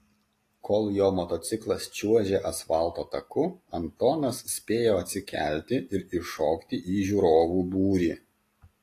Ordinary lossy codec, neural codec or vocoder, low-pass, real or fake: AAC, 48 kbps; none; 14.4 kHz; real